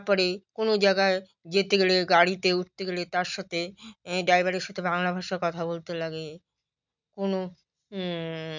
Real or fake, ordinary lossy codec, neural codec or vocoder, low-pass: real; none; none; 7.2 kHz